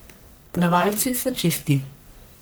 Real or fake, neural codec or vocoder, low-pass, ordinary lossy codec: fake; codec, 44.1 kHz, 1.7 kbps, Pupu-Codec; none; none